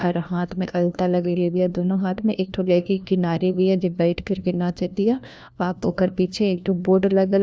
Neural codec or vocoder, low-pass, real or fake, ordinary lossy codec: codec, 16 kHz, 1 kbps, FunCodec, trained on LibriTTS, 50 frames a second; none; fake; none